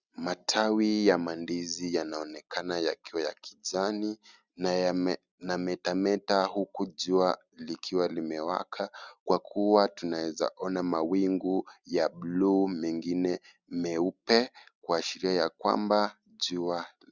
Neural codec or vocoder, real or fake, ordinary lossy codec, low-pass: none; real; Opus, 64 kbps; 7.2 kHz